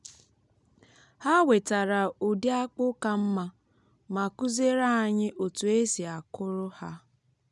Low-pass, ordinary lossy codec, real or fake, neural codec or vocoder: 10.8 kHz; none; real; none